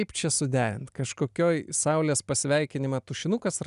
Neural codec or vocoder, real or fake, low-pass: none; real; 10.8 kHz